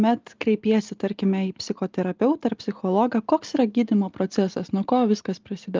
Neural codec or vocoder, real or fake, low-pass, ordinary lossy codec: none; real; 7.2 kHz; Opus, 32 kbps